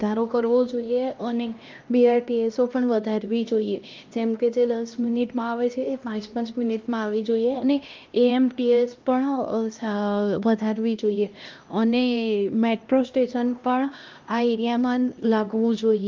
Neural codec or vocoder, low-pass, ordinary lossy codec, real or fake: codec, 16 kHz, 1 kbps, X-Codec, HuBERT features, trained on LibriSpeech; 7.2 kHz; Opus, 24 kbps; fake